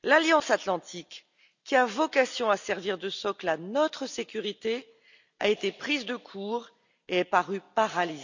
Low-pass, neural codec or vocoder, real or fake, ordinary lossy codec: 7.2 kHz; none; real; none